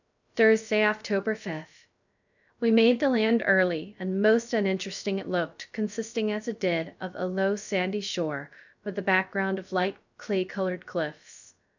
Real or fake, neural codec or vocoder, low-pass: fake; codec, 16 kHz, 0.3 kbps, FocalCodec; 7.2 kHz